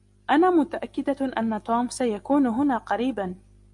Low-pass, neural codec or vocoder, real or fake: 10.8 kHz; none; real